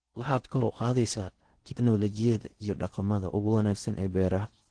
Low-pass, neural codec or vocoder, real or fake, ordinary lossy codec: 9.9 kHz; codec, 16 kHz in and 24 kHz out, 0.6 kbps, FocalCodec, streaming, 4096 codes; fake; Opus, 16 kbps